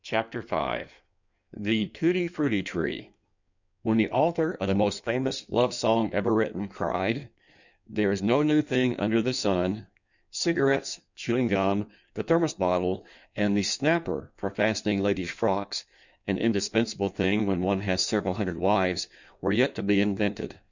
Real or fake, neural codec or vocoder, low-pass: fake; codec, 16 kHz in and 24 kHz out, 1.1 kbps, FireRedTTS-2 codec; 7.2 kHz